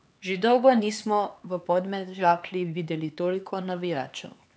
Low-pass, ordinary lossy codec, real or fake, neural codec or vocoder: none; none; fake; codec, 16 kHz, 4 kbps, X-Codec, HuBERT features, trained on LibriSpeech